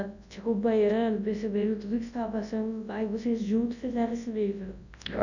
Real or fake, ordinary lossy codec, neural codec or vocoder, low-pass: fake; none; codec, 24 kHz, 0.9 kbps, WavTokenizer, large speech release; 7.2 kHz